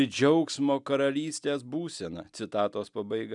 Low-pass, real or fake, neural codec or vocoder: 10.8 kHz; real; none